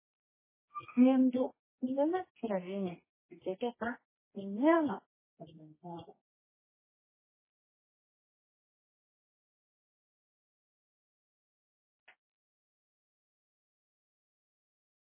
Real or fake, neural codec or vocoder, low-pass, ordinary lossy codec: fake; codec, 24 kHz, 0.9 kbps, WavTokenizer, medium music audio release; 3.6 kHz; MP3, 16 kbps